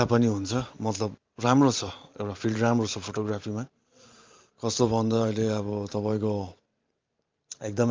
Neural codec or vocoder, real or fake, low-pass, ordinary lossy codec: vocoder, 44.1 kHz, 128 mel bands every 512 samples, BigVGAN v2; fake; 7.2 kHz; Opus, 24 kbps